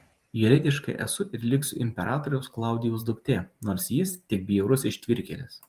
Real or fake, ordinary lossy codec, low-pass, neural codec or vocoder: fake; Opus, 32 kbps; 14.4 kHz; vocoder, 44.1 kHz, 128 mel bands every 512 samples, BigVGAN v2